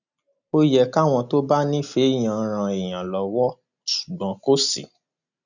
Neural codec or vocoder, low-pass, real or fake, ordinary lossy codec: none; 7.2 kHz; real; none